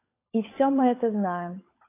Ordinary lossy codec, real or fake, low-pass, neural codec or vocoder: AAC, 24 kbps; fake; 3.6 kHz; codec, 16 kHz, 16 kbps, FunCodec, trained on LibriTTS, 50 frames a second